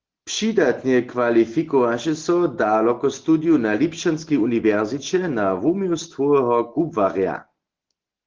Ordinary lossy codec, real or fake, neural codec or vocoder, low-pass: Opus, 16 kbps; real; none; 7.2 kHz